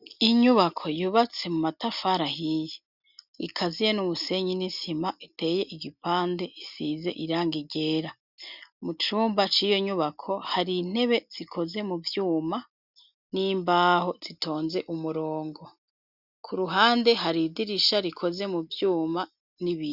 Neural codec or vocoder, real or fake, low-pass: none; real; 5.4 kHz